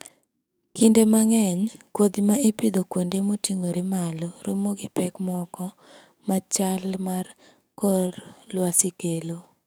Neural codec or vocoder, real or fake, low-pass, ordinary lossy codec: codec, 44.1 kHz, 7.8 kbps, DAC; fake; none; none